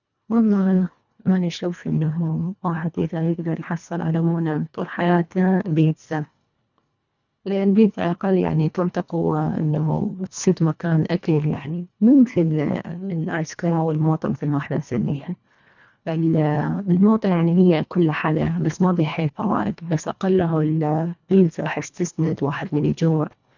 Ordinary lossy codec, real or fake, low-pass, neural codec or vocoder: none; fake; 7.2 kHz; codec, 24 kHz, 1.5 kbps, HILCodec